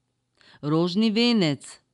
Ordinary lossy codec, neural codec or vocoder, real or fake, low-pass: none; none; real; 10.8 kHz